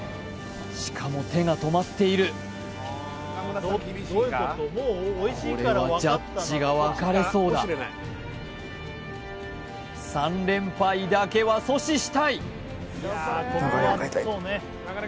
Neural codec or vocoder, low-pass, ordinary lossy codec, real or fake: none; none; none; real